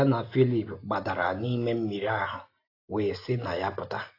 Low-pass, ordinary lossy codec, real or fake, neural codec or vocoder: 5.4 kHz; AAC, 32 kbps; real; none